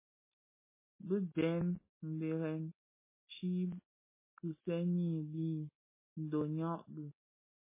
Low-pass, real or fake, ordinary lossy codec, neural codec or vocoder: 3.6 kHz; real; MP3, 16 kbps; none